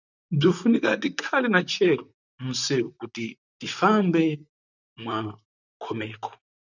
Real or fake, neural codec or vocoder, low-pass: fake; vocoder, 44.1 kHz, 128 mel bands, Pupu-Vocoder; 7.2 kHz